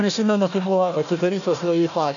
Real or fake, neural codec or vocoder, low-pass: fake; codec, 16 kHz, 1 kbps, FunCodec, trained on Chinese and English, 50 frames a second; 7.2 kHz